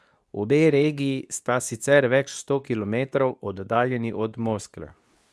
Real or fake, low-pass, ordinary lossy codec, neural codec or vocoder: fake; none; none; codec, 24 kHz, 0.9 kbps, WavTokenizer, medium speech release version 2